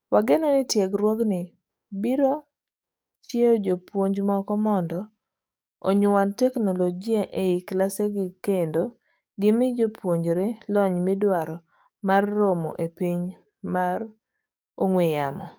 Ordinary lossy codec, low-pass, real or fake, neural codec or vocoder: none; none; fake; codec, 44.1 kHz, 7.8 kbps, DAC